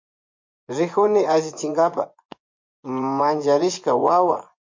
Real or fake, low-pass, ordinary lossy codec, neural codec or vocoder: fake; 7.2 kHz; MP3, 48 kbps; vocoder, 24 kHz, 100 mel bands, Vocos